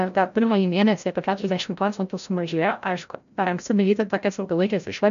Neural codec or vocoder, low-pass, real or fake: codec, 16 kHz, 0.5 kbps, FreqCodec, larger model; 7.2 kHz; fake